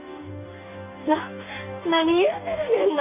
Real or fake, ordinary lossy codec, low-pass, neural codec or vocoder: fake; none; 3.6 kHz; codec, 44.1 kHz, 2.6 kbps, DAC